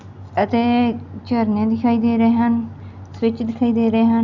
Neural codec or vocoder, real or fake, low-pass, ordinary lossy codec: none; real; 7.2 kHz; none